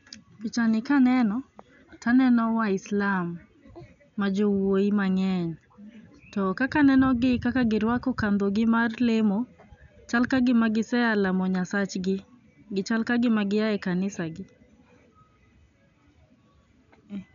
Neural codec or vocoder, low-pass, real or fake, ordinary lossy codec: none; 7.2 kHz; real; none